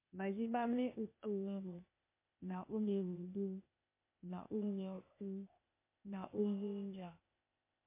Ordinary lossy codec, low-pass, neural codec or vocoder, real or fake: MP3, 24 kbps; 3.6 kHz; codec, 16 kHz, 0.8 kbps, ZipCodec; fake